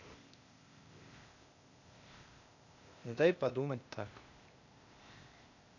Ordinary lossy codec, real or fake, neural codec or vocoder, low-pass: none; fake; codec, 16 kHz, 0.8 kbps, ZipCodec; 7.2 kHz